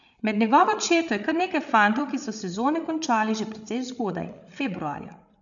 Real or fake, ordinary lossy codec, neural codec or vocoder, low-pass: fake; none; codec, 16 kHz, 8 kbps, FreqCodec, larger model; 7.2 kHz